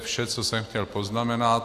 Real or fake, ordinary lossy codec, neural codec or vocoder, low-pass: real; AAC, 64 kbps; none; 14.4 kHz